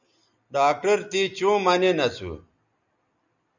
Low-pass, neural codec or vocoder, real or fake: 7.2 kHz; none; real